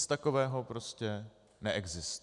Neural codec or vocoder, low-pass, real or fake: none; 10.8 kHz; real